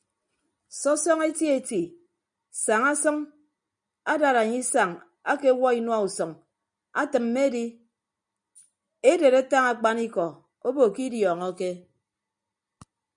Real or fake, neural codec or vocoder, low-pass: real; none; 9.9 kHz